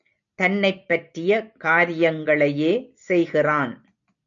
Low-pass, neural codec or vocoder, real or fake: 7.2 kHz; none; real